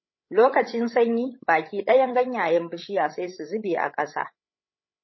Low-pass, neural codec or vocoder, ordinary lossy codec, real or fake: 7.2 kHz; codec, 16 kHz, 16 kbps, FreqCodec, larger model; MP3, 24 kbps; fake